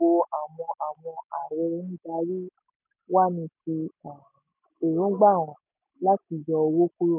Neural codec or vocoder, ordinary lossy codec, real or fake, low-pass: none; none; real; 3.6 kHz